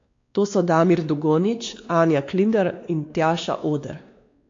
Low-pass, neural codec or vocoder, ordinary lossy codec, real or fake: 7.2 kHz; codec, 16 kHz, 2 kbps, X-Codec, WavLM features, trained on Multilingual LibriSpeech; AAC, 48 kbps; fake